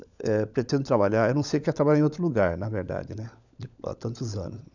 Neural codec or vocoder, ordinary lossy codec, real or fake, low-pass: codec, 16 kHz, 16 kbps, FunCodec, trained on LibriTTS, 50 frames a second; none; fake; 7.2 kHz